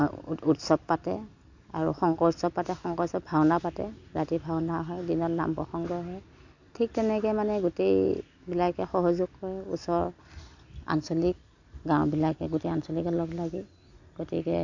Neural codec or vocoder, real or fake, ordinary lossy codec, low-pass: none; real; AAC, 48 kbps; 7.2 kHz